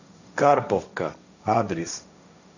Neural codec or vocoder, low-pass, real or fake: codec, 16 kHz, 1.1 kbps, Voila-Tokenizer; 7.2 kHz; fake